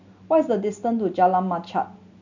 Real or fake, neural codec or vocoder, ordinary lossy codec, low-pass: real; none; none; 7.2 kHz